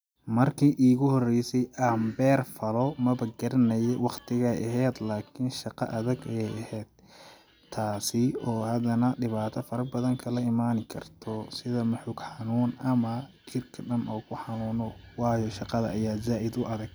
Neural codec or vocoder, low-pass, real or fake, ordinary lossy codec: none; none; real; none